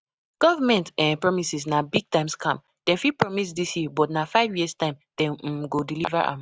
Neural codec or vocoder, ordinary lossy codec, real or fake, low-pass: none; none; real; none